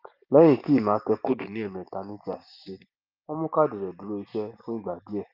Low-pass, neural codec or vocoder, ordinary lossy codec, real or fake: 5.4 kHz; none; Opus, 32 kbps; real